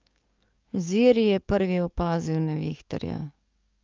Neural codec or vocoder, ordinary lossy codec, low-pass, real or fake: codec, 44.1 kHz, 7.8 kbps, DAC; Opus, 24 kbps; 7.2 kHz; fake